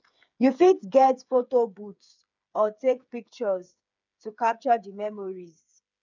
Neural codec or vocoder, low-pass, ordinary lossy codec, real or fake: codec, 16 kHz, 8 kbps, FreqCodec, smaller model; 7.2 kHz; none; fake